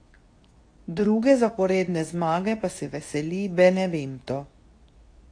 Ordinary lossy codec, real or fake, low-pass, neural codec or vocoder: AAC, 48 kbps; fake; 9.9 kHz; codec, 24 kHz, 0.9 kbps, WavTokenizer, medium speech release version 2